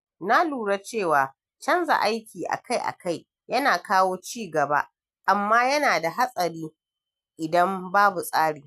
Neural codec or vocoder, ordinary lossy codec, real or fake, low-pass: none; none; real; 14.4 kHz